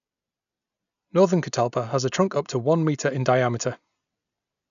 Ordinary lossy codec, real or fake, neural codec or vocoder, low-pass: none; real; none; 7.2 kHz